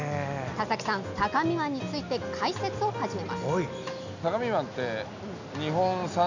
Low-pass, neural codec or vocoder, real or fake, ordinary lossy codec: 7.2 kHz; none; real; none